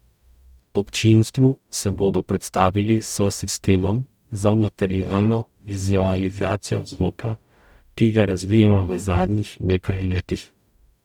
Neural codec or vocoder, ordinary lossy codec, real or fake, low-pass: codec, 44.1 kHz, 0.9 kbps, DAC; none; fake; 19.8 kHz